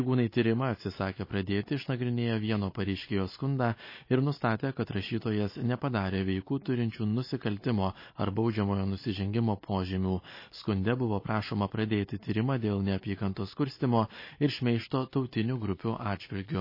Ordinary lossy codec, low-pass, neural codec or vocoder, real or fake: MP3, 24 kbps; 5.4 kHz; none; real